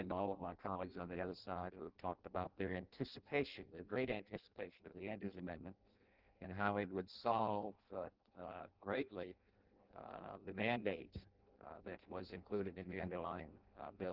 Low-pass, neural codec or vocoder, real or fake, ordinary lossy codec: 5.4 kHz; codec, 16 kHz in and 24 kHz out, 0.6 kbps, FireRedTTS-2 codec; fake; Opus, 16 kbps